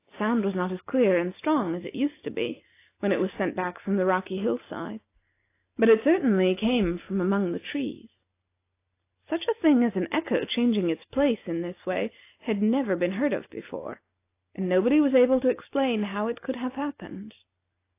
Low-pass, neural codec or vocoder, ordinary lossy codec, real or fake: 3.6 kHz; none; AAC, 24 kbps; real